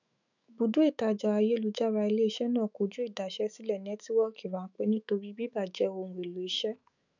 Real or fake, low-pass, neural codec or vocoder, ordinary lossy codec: fake; 7.2 kHz; autoencoder, 48 kHz, 128 numbers a frame, DAC-VAE, trained on Japanese speech; none